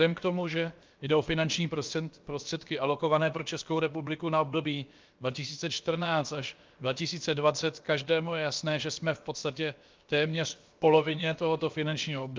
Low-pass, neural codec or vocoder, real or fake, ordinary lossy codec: 7.2 kHz; codec, 16 kHz, about 1 kbps, DyCAST, with the encoder's durations; fake; Opus, 32 kbps